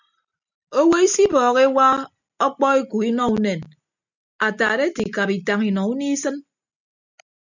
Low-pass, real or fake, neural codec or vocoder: 7.2 kHz; real; none